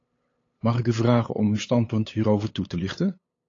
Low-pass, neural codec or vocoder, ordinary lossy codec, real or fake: 7.2 kHz; codec, 16 kHz, 8 kbps, FunCodec, trained on LibriTTS, 25 frames a second; AAC, 32 kbps; fake